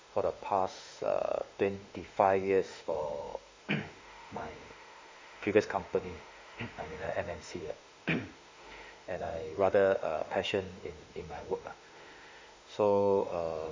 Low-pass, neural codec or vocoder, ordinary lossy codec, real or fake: 7.2 kHz; autoencoder, 48 kHz, 32 numbers a frame, DAC-VAE, trained on Japanese speech; MP3, 64 kbps; fake